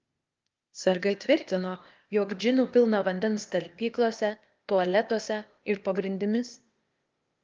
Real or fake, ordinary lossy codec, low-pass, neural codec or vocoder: fake; Opus, 24 kbps; 7.2 kHz; codec, 16 kHz, 0.8 kbps, ZipCodec